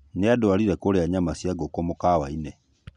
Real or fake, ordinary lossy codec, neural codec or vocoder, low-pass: real; none; none; 10.8 kHz